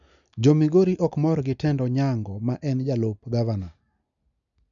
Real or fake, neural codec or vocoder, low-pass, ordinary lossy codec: real; none; 7.2 kHz; none